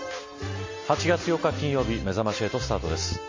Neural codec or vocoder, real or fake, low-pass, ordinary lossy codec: none; real; 7.2 kHz; MP3, 32 kbps